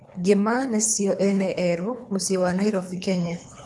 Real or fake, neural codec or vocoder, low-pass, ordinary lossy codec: fake; codec, 24 kHz, 3 kbps, HILCodec; none; none